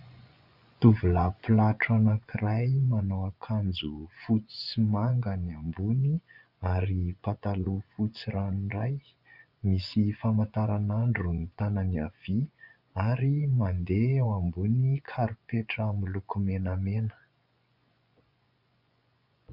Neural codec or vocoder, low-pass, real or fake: vocoder, 24 kHz, 100 mel bands, Vocos; 5.4 kHz; fake